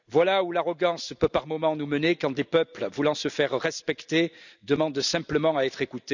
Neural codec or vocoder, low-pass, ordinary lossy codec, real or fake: none; 7.2 kHz; none; real